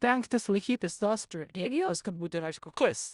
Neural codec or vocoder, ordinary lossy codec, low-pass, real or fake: codec, 16 kHz in and 24 kHz out, 0.4 kbps, LongCat-Audio-Codec, four codebook decoder; Opus, 64 kbps; 10.8 kHz; fake